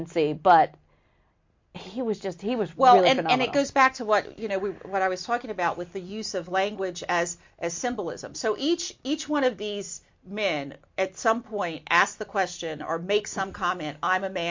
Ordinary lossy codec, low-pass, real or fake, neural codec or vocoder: MP3, 48 kbps; 7.2 kHz; real; none